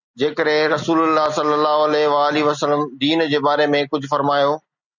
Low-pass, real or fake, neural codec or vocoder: 7.2 kHz; real; none